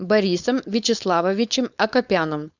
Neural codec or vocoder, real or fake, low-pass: codec, 16 kHz, 4.8 kbps, FACodec; fake; 7.2 kHz